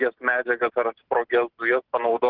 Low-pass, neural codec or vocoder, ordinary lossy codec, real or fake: 5.4 kHz; none; Opus, 16 kbps; real